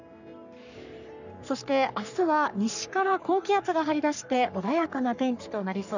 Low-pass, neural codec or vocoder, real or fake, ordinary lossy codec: 7.2 kHz; codec, 44.1 kHz, 3.4 kbps, Pupu-Codec; fake; none